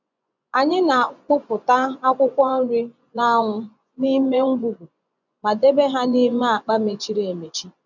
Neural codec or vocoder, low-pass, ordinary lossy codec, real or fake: vocoder, 24 kHz, 100 mel bands, Vocos; 7.2 kHz; none; fake